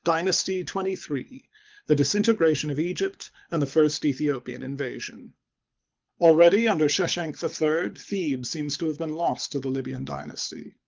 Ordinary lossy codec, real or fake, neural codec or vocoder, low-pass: Opus, 24 kbps; fake; codec, 24 kHz, 6 kbps, HILCodec; 7.2 kHz